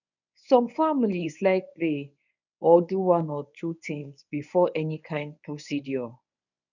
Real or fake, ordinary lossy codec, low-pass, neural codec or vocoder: fake; none; 7.2 kHz; codec, 24 kHz, 0.9 kbps, WavTokenizer, medium speech release version 1